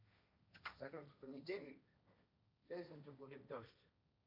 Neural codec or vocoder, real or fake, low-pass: codec, 16 kHz, 1.1 kbps, Voila-Tokenizer; fake; 5.4 kHz